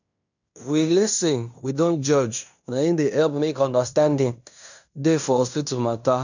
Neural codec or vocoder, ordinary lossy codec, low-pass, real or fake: codec, 16 kHz in and 24 kHz out, 0.9 kbps, LongCat-Audio-Codec, fine tuned four codebook decoder; none; 7.2 kHz; fake